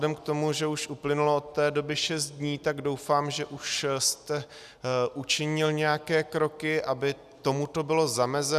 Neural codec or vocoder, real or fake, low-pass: none; real; 14.4 kHz